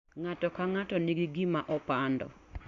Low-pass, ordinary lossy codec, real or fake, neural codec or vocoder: 7.2 kHz; Opus, 64 kbps; real; none